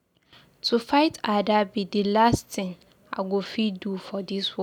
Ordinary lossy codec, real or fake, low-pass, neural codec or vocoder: none; real; 19.8 kHz; none